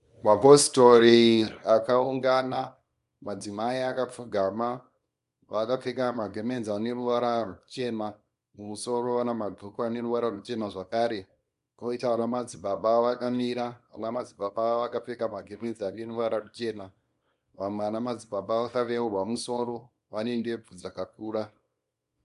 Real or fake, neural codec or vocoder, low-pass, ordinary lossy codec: fake; codec, 24 kHz, 0.9 kbps, WavTokenizer, small release; 10.8 kHz; Opus, 64 kbps